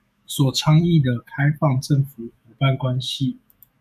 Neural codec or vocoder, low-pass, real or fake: autoencoder, 48 kHz, 128 numbers a frame, DAC-VAE, trained on Japanese speech; 14.4 kHz; fake